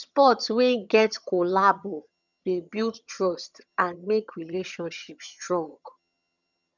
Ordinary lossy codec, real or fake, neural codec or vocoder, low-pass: none; fake; vocoder, 22.05 kHz, 80 mel bands, HiFi-GAN; 7.2 kHz